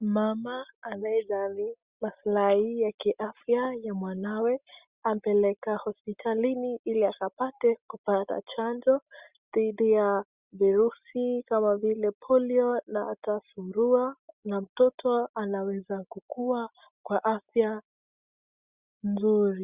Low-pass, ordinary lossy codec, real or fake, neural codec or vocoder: 3.6 kHz; Opus, 64 kbps; real; none